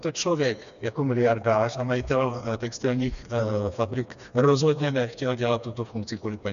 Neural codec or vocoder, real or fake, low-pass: codec, 16 kHz, 2 kbps, FreqCodec, smaller model; fake; 7.2 kHz